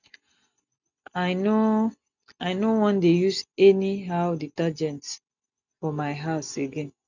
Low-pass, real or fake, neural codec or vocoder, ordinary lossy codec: 7.2 kHz; real; none; none